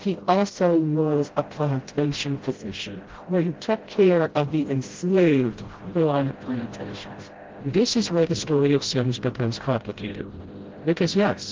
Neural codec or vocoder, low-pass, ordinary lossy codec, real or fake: codec, 16 kHz, 0.5 kbps, FreqCodec, smaller model; 7.2 kHz; Opus, 16 kbps; fake